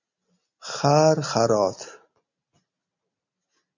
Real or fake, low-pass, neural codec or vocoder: real; 7.2 kHz; none